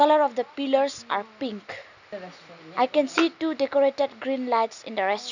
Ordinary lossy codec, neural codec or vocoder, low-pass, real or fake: none; none; 7.2 kHz; real